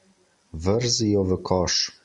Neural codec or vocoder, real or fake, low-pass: none; real; 10.8 kHz